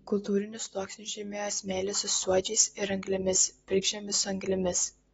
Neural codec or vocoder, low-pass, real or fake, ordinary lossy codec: none; 19.8 kHz; real; AAC, 24 kbps